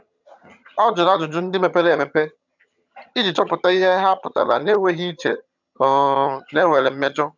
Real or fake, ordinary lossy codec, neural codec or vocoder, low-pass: fake; none; vocoder, 22.05 kHz, 80 mel bands, HiFi-GAN; 7.2 kHz